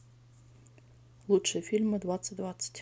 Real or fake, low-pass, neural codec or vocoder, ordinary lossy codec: real; none; none; none